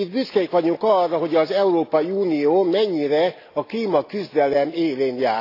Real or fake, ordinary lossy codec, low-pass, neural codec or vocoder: real; AAC, 32 kbps; 5.4 kHz; none